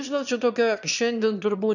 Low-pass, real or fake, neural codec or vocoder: 7.2 kHz; fake; autoencoder, 22.05 kHz, a latent of 192 numbers a frame, VITS, trained on one speaker